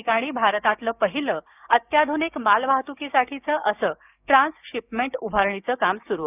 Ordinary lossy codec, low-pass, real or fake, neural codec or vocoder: none; 3.6 kHz; fake; codec, 16 kHz, 6 kbps, DAC